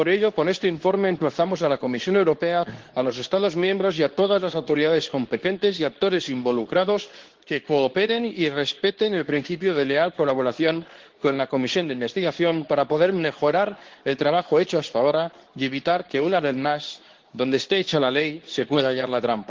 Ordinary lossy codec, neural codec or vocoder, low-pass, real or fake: Opus, 16 kbps; codec, 24 kHz, 0.9 kbps, WavTokenizer, medium speech release version 2; 7.2 kHz; fake